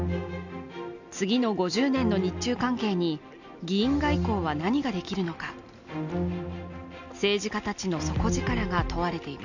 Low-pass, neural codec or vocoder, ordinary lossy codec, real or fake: 7.2 kHz; none; none; real